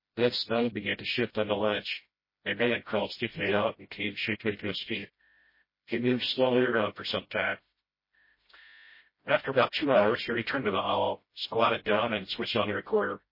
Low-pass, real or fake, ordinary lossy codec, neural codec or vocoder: 5.4 kHz; fake; MP3, 24 kbps; codec, 16 kHz, 0.5 kbps, FreqCodec, smaller model